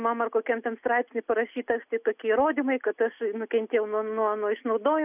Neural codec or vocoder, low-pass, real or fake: none; 3.6 kHz; real